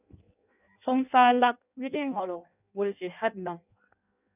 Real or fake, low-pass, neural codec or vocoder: fake; 3.6 kHz; codec, 16 kHz in and 24 kHz out, 0.6 kbps, FireRedTTS-2 codec